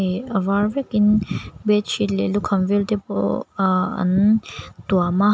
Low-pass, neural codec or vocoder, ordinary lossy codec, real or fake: none; none; none; real